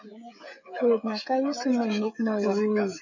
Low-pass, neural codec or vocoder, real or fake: 7.2 kHz; codec, 16 kHz, 16 kbps, FreqCodec, smaller model; fake